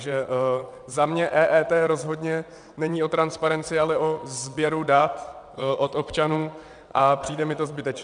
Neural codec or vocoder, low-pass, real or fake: vocoder, 22.05 kHz, 80 mel bands, WaveNeXt; 9.9 kHz; fake